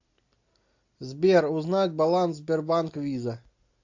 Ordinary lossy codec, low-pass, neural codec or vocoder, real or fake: AAC, 48 kbps; 7.2 kHz; none; real